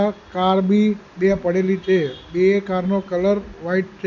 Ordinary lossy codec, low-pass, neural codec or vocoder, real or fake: none; 7.2 kHz; none; real